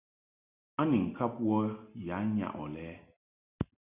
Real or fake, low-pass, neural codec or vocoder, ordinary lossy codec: real; 3.6 kHz; none; Opus, 64 kbps